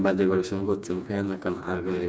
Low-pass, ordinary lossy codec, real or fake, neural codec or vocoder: none; none; fake; codec, 16 kHz, 2 kbps, FreqCodec, smaller model